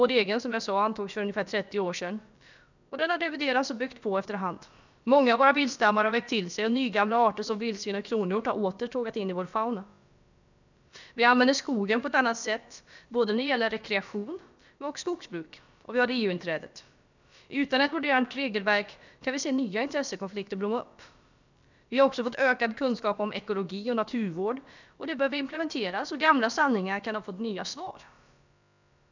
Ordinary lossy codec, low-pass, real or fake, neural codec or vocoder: none; 7.2 kHz; fake; codec, 16 kHz, about 1 kbps, DyCAST, with the encoder's durations